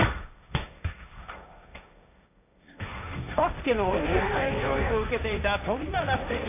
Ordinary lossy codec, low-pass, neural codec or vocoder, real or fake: none; 3.6 kHz; codec, 16 kHz, 1.1 kbps, Voila-Tokenizer; fake